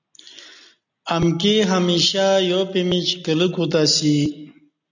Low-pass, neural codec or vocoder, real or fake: 7.2 kHz; none; real